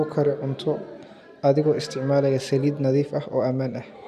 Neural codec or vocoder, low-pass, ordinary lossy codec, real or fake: none; 14.4 kHz; none; real